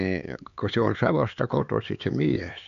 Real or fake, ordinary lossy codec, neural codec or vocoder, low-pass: fake; none; codec, 16 kHz, 4 kbps, X-Codec, HuBERT features, trained on LibriSpeech; 7.2 kHz